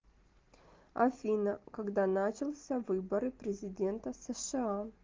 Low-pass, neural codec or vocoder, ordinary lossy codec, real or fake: 7.2 kHz; none; Opus, 32 kbps; real